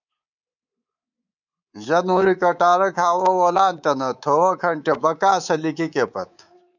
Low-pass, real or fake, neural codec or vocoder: 7.2 kHz; fake; codec, 24 kHz, 3.1 kbps, DualCodec